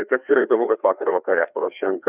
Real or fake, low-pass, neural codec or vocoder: fake; 3.6 kHz; codec, 16 kHz, 2 kbps, FreqCodec, larger model